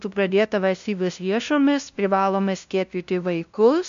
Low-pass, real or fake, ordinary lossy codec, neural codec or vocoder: 7.2 kHz; fake; MP3, 64 kbps; codec, 16 kHz, 0.5 kbps, FunCodec, trained on LibriTTS, 25 frames a second